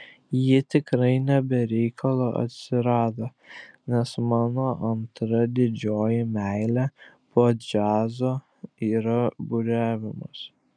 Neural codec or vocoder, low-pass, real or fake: none; 9.9 kHz; real